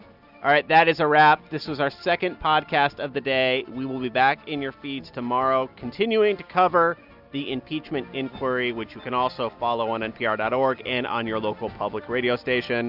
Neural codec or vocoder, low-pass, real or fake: none; 5.4 kHz; real